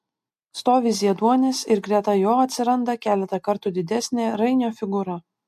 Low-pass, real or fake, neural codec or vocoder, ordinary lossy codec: 14.4 kHz; real; none; MP3, 64 kbps